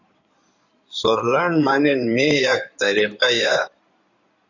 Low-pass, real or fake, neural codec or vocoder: 7.2 kHz; fake; codec, 16 kHz in and 24 kHz out, 2.2 kbps, FireRedTTS-2 codec